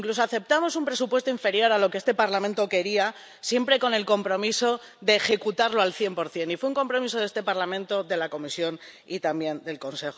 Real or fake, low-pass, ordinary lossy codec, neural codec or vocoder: real; none; none; none